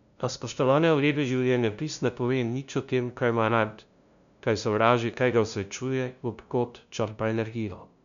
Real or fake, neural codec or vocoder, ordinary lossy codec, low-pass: fake; codec, 16 kHz, 0.5 kbps, FunCodec, trained on LibriTTS, 25 frames a second; none; 7.2 kHz